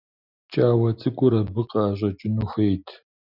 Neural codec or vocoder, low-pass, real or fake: none; 5.4 kHz; real